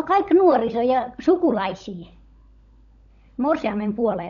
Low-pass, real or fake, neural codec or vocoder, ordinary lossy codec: 7.2 kHz; fake; codec, 16 kHz, 16 kbps, FunCodec, trained on LibriTTS, 50 frames a second; none